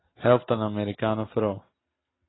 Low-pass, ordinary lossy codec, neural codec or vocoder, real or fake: 7.2 kHz; AAC, 16 kbps; none; real